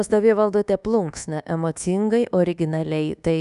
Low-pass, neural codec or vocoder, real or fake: 10.8 kHz; codec, 24 kHz, 1.2 kbps, DualCodec; fake